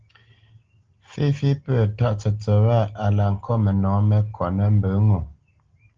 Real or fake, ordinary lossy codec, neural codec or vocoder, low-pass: real; Opus, 16 kbps; none; 7.2 kHz